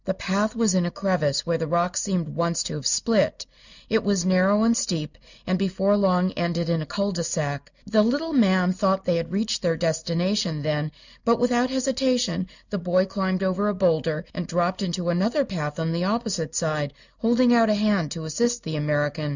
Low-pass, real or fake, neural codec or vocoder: 7.2 kHz; real; none